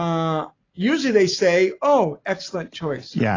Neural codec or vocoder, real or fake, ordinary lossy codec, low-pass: none; real; AAC, 32 kbps; 7.2 kHz